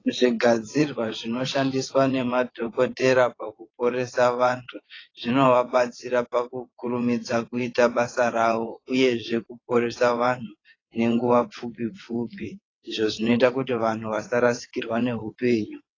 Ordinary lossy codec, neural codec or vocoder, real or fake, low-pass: AAC, 32 kbps; vocoder, 22.05 kHz, 80 mel bands, WaveNeXt; fake; 7.2 kHz